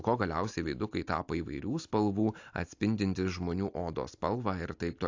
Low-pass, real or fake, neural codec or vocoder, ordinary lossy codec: 7.2 kHz; real; none; AAC, 48 kbps